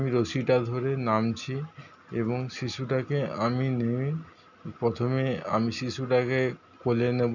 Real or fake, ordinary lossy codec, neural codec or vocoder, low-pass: real; none; none; 7.2 kHz